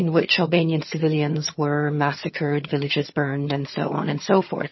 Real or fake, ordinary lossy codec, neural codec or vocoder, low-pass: fake; MP3, 24 kbps; vocoder, 22.05 kHz, 80 mel bands, HiFi-GAN; 7.2 kHz